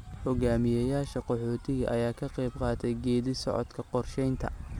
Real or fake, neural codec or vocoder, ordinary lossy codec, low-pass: real; none; MP3, 96 kbps; 19.8 kHz